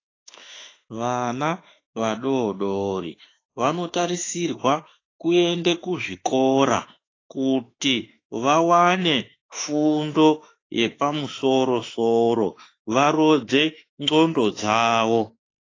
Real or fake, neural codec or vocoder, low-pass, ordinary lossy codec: fake; autoencoder, 48 kHz, 32 numbers a frame, DAC-VAE, trained on Japanese speech; 7.2 kHz; AAC, 32 kbps